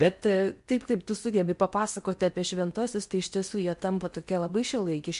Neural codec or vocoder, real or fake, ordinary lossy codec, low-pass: codec, 16 kHz in and 24 kHz out, 0.8 kbps, FocalCodec, streaming, 65536 codes; fake; MP3, 64 kbps; 10.8 kHz